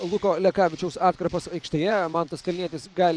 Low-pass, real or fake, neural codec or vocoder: 9.9 kHz; fake; vocoder, 24 kHz, 100 mel bands, Vocos